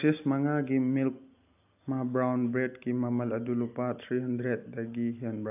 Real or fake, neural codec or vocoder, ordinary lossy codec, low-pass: real; none; AAC, 32 kbps; 3.6 kHz